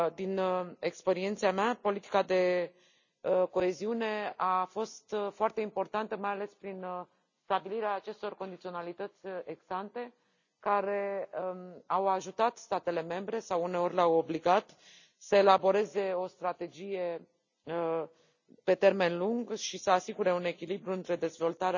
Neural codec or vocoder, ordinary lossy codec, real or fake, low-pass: none; none; real; 7.2 kHz